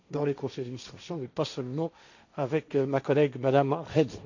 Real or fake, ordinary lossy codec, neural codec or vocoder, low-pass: fake; none; codec, 16 kHz, 1.1 kbps, Voila-Tokenizer; 7.2 kHz